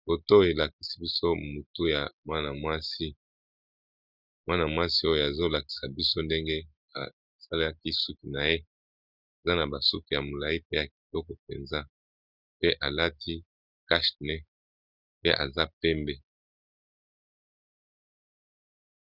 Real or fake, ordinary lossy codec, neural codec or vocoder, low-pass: real; Opus, 64 kbps; none; 5.4 kHz